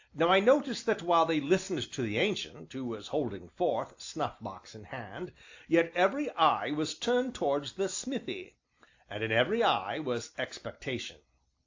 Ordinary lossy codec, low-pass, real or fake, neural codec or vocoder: AAC, 48 kbps; 7.2 kHz; real; none